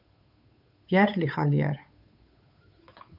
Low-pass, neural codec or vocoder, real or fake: 5.4 kHz; codec, 16 kHz, 8 kbps, FunCodec, trained on Chinese and English, 25 frames a second; fake